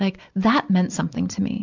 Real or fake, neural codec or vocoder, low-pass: real; none; 7.2 kHz